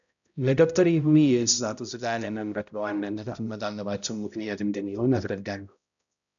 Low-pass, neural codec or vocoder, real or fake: 7.2 kHz; codec, 16 kHz, 0.5 kbps, X-Codec, HuBERT features, trained on balanced general audio; fake